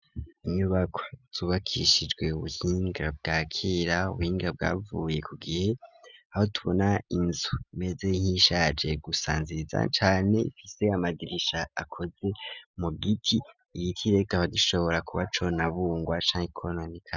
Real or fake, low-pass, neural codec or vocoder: real; 7.2 kHz; none